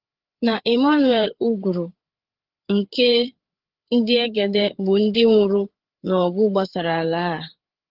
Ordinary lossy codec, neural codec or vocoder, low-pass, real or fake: Opus, 16 kbps; codec, 16 kHz, 8 kbps, FreqCodec, larger model; 5.4 kHz; fake